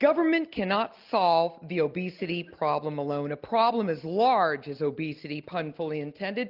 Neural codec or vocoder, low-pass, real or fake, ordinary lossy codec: none; 5.4 kHz; real; Opus, 24 kbps